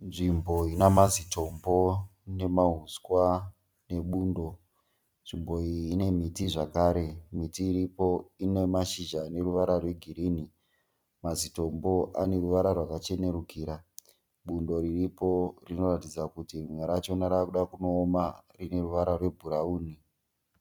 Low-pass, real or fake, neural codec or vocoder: 19.8 kHz; fake; vocoder, 44.1 kHz, 128 mel bands every 256 samples, BigVGAN v2